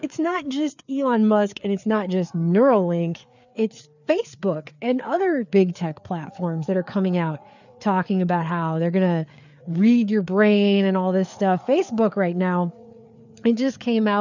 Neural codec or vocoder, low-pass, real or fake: codec, 16 kHz, 4 kbps, FreqCodec, larger model; 7.2 kHz; fake